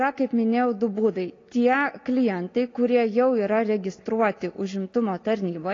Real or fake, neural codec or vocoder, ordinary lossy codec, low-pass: real; none; AAC, 32 kbps; 7.2 kHz